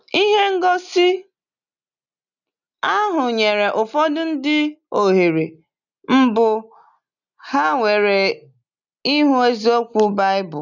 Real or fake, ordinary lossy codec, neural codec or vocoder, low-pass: real; none; none; 7.2 kHz